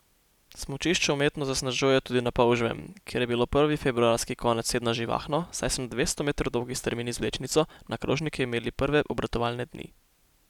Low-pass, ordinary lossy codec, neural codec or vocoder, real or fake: 19.8 kHz; none; none; real